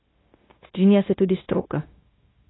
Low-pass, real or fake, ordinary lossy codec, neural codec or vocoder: 7.2 kHz; fake; AAC, 16 kbps; codec, 16 kHz, 0.9 kbps, LongCat-Audio-Codec